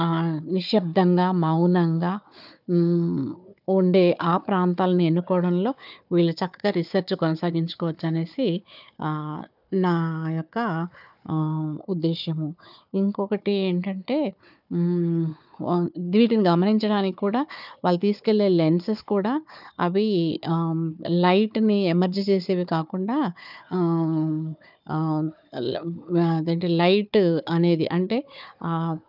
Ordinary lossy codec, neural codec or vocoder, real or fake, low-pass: none; codec, 16 kHz, 4 kbps, FunCodec, trained on Chinese and English, 50 frames a second; fake; 5.4 kHz